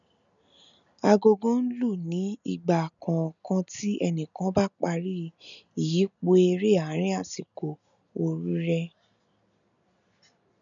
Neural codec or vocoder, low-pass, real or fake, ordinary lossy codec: none; 7.2 kHz; real; none